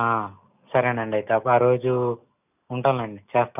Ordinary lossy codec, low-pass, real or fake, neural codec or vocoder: none; 3.6 kHz; real; none